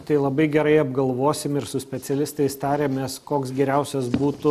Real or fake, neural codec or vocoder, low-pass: real; none; 14.4 kHz